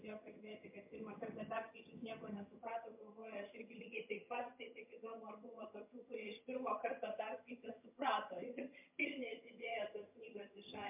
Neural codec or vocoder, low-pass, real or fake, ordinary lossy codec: vocoder, 22.05 kHz, 80 mel bands, HiFi-GAN; 3.6 kHz; fake; MP3, 32 kbps